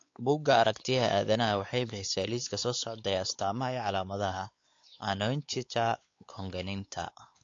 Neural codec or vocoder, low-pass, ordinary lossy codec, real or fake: codec, 16 kHz, 4 kbps, X-Codec, WavLM features, trained on Multilingual LibriSpeech; 7.2 kHz; AAC, 48 kbps; fake